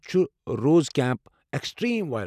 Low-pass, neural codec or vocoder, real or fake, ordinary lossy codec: 14.4 kHz; none; real; none